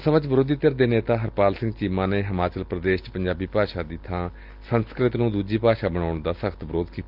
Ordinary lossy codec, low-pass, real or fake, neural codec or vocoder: Opus, 24 kbps; 5.4 kHz; real; none